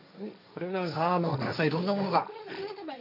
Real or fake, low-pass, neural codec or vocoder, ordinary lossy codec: fake; 5.4 kHz; codec, 16 kHz, 1.1 kbps, Voila-Tokenizer; none